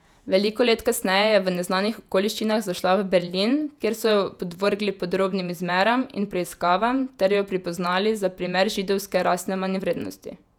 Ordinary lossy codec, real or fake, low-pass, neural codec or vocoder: none; fake; 19.8 kHz; vocoder, 48 kHz, 128 mel bands, Vocos